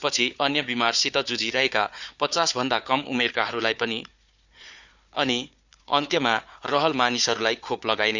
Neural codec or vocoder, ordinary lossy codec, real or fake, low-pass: codec, 16 kHz, 4 kbps, FunCodec, trained on Chinese and English, 50 frames a second; Opus, 64 kbps; fake; 7.2 kHz